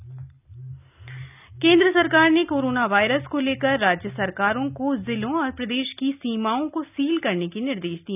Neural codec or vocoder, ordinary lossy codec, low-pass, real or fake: none; none; 3.6 kHz; real